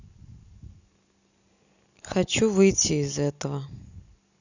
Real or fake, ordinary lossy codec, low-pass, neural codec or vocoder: real; none; 7.2 kHz; none